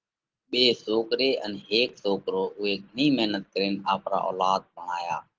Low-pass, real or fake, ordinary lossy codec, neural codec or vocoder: 7.2 kHz; real; Opus, 16 kbps; none